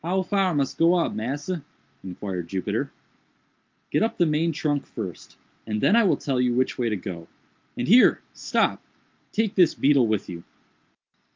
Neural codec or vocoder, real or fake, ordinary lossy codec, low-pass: none; real; Opus, 24 kbps; 7.2 kHz